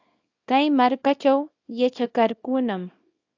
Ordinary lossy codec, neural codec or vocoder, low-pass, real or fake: AAC, 48 kbps; codec, 24 kHz, 0.9 kbps, WavTokenizer, small release; 7.2 kHz; fake